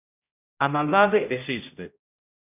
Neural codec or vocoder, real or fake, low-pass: codec, 16 kHz, 0.5 kbps, X-Codec, HuBERT features, trained on general audio; fake; 3.6 kHz